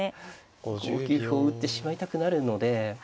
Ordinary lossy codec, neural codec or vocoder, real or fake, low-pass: none; none; real; none